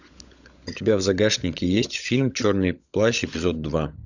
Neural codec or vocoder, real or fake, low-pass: codec, 16 kHz, 16 kbps, FunCodec, trained on LibriTTS, 50 frames a second; fake; 7.2 kHz